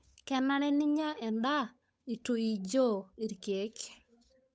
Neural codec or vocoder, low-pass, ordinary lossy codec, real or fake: codec, 16 kHz, 8 kbps, FunCodec, trained on Chinese and English, 25 frames a second; none; none; fake